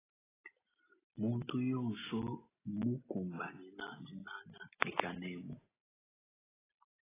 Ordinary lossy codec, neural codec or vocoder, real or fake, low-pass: AAC, 16 kbps; none; real; 3.6 kHz